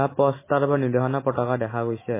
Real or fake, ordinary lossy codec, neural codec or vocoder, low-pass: real; MP3, 16 kbps; none; 3.6 kHz